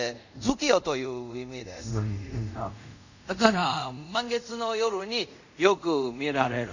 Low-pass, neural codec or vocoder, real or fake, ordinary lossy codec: 7.2 kHz; codec, 24 kHz, 0.5 kbps, DualCodec; fake; none